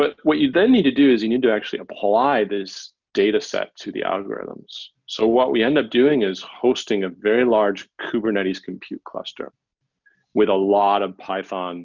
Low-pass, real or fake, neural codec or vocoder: 7.2 kHz; real; none